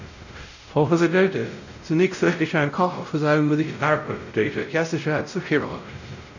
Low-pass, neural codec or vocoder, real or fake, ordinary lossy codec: 7.2 kHz; codec, 16 kHz, 0.5 kbps, X-Codec, WavLM features, trained on Multilingual LibriSpeech; fake; none